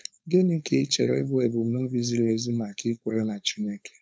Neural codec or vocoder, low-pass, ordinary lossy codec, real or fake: codec, 16 kHz, 4.8 kbps, FACodec; none; none; fake